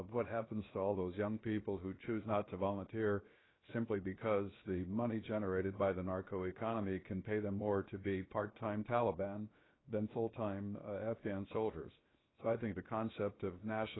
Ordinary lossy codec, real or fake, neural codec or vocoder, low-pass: AAC, 16 kbps; fake; codec, 16 kHz, 0.7 kbps, FocalCodec; 7.2 kHz